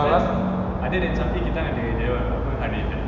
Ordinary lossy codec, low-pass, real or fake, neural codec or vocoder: none; 7.2 kHz; real; none